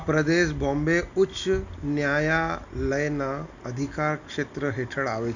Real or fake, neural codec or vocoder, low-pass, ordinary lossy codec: real; none; 7.2 kHz; none